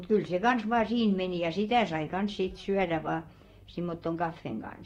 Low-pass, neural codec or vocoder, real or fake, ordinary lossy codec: 19.8 kHz; vocoder, 44.1 kHz, 128 mel bands, Pupu-Vocoder; fake; AAC, 48 kbps